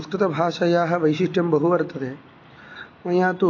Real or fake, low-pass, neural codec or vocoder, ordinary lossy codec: real; 7.2 kHz; none; AAC, 32 kbps